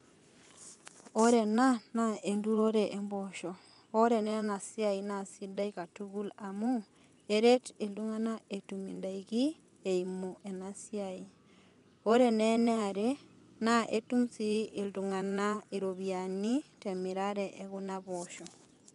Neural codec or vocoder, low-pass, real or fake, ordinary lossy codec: vocoder, 24 kHz, 100 mel bands, Vocos; 10.8 kHz; fake; MP3, 96 kbps